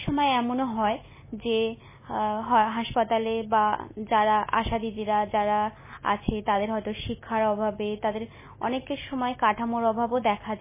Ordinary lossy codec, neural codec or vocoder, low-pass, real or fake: MP3, 16 kbps; none; 3.6 kHz; real